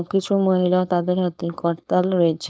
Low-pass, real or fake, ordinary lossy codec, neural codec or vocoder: none; fake; none; codec, 16 kHz, 4.8 kbps, FACodec